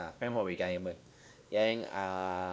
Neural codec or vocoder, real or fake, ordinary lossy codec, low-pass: codec, 16 kHz, 2 kbps, X-Codec, WavLM features, trained on Multilingual LibriSpeech; fake; none; none